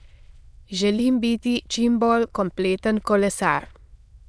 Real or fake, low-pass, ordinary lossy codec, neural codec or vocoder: fake; none; none; autoencoder, 22.05 kHz, a latent of 192 numbers a frame, VITS, trained on many speakers